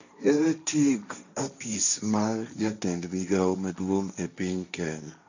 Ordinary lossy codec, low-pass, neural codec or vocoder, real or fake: none; 7.2 kHz; codec, 16 kHz, 1.1 kbps, Voila-Tokenizer; fake